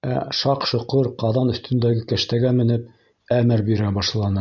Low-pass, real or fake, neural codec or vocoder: 7.2 kHz; real; none